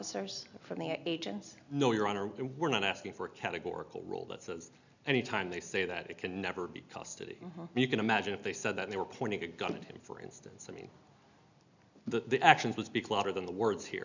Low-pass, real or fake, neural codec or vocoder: 7.2 kHz; real; none